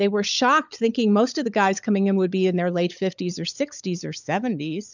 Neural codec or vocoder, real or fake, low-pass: codec, 16 kHz, 16 kbps, FunCodec, trained on LibriTTS, 50 frames a second; fake; 7.2 kHz